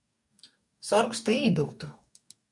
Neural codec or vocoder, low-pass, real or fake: codec, 44.1 kHz, 2.6 kbps, DAC; 10.8 kHz; fake